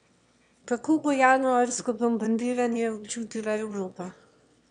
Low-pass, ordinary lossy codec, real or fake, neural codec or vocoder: 9.9 kHz; none; fake; autoencoder, 22.05 kHz, a latent of 192 numbers a frame, VITS, trained on one speaker